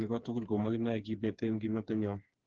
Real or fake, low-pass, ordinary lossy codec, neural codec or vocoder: fake; 7.2 kHz; Opus, 16 kbps; codec, 16 kHz, 4 kbps, FreqCodec, smaller model